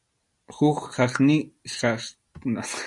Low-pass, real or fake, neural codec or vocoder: 10.8 kHz; real; none